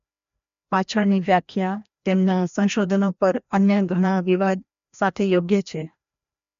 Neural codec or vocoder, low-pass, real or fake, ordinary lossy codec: codec, 16 kHz, 1 kbps, FreqCodec, larger model; 7.2 kHz; fake; MP3, 64 kbps